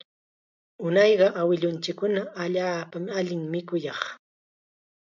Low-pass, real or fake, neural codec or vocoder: 7.2 kHz; real; none